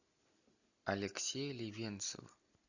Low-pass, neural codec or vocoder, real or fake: 7.2 kHz; none; real